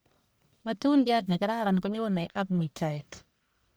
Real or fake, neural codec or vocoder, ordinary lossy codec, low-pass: fake; codec, 44.1 kHz, 1.7 kbps, Pupu-Codec; none; none